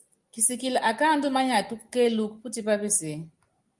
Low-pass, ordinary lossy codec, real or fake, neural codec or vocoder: 10.8 kHz; Opus, 32 kbps; real; none